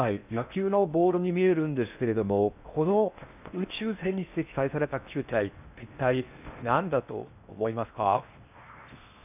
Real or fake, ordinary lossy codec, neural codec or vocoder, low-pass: fake; none; codec, 16 kHz in and 24 kHz out, 0.6 kbps, FocalCodec, streaming, 4096 codes; 3.6 kHz